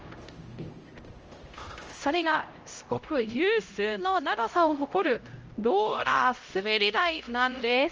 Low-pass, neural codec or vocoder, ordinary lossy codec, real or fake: 7.2 kHz; codec, 16 kHz, 0.5 kbps, X-Codec, HuBERT features, trained on LibriSpeech; Opus, 24 kbps; fake